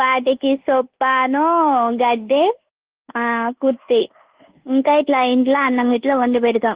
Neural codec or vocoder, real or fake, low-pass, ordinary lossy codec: codec, 24 kHz, 1.2 kbps, DualCodec; fake; 3.6 kHz; Opus, 16 kbps